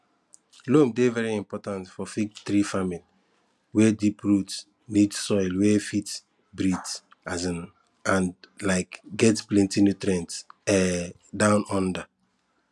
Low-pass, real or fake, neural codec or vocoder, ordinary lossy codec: none; real; none; none